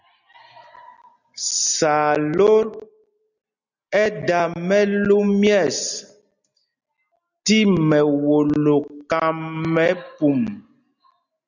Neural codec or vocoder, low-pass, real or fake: none; 7.2 kHz; real